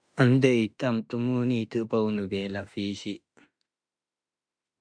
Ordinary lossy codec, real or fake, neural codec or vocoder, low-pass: MP3, 96 kbps; fake; autoencoder, 48 kHz, 32 numbers a frame, DAC-VAE, trained on Japanese speech; 9.9 kHz